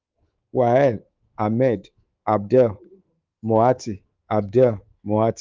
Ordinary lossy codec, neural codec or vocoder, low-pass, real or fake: Opus, 32 kbps; codec, 24 kHz, 3.1 kbps, DualCodec; 7.2 kHz; fake